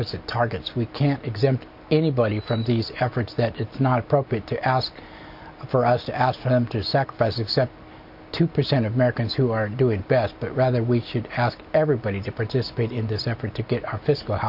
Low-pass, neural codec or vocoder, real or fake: 5.4 kHz; none; real